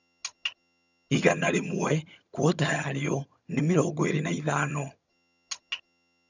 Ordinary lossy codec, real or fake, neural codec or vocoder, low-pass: none; fake; vocoder, 22.05 kHz, 80 mel bands, HiFi-GAN; 7.2 kHz